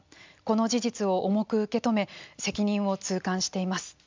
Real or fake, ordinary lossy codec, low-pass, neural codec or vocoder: real; MP3, 64 kbps; 7.2 kHz; none